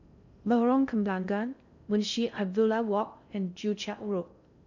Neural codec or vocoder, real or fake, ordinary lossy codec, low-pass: codec, 16 kHz in and 24 kHz out, 0.6 kbps, FocalCodec, streaming, 4096 codes; fake; none; 7.2 kHz